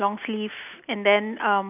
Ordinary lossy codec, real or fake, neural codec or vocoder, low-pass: none; real; none; 3.6 kHz